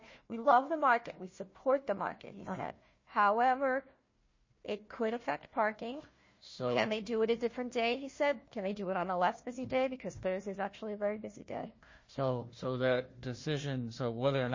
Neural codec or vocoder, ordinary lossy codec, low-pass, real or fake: codec, 16 kHz, 1 kbps, FunCodec, trained on Chinese and English, 50 frames a second; MP3, 32 kbps; 7.2 kHz; fake